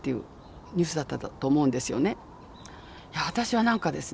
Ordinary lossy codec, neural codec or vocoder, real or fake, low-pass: none; none; real; none